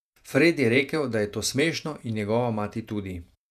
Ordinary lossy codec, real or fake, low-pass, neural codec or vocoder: none; fake; 14.4 kHz; vocoder, 48 kHz, 128 mel bands, Vocos